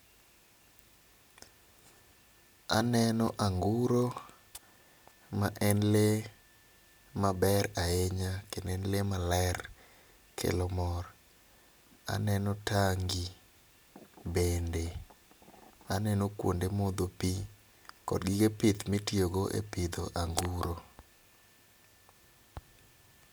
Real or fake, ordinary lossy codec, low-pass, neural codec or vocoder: real; none; none; none